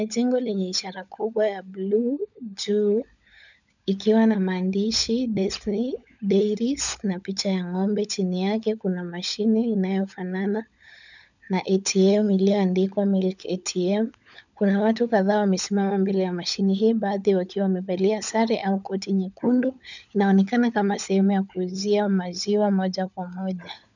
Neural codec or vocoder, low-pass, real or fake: codec, 16 kHz, 16 kbps, FunCodec, trained on LibriTTS, 50 frames a second; 7.2 kHz; fake